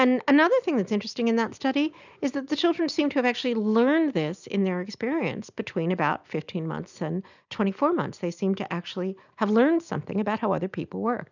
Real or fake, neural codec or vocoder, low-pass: real; none; 7.2 kHz